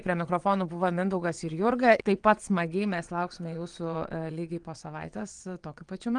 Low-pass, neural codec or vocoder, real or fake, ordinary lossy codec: 9.9 kHz; vocoder, 22.05 kHz, 80 mel bands, WaveNeXt; fake; Opus, 24 kbps